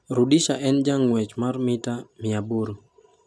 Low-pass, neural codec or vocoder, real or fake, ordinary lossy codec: none; none; real; none